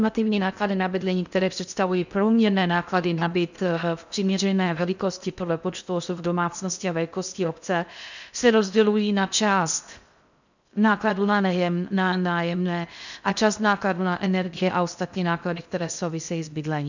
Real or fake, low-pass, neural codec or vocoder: fake; 7.2 kHz; codec, 16 kHz in and 24 kHz out, 0.6 kbps, FocalCodec, streaming, 4096 codes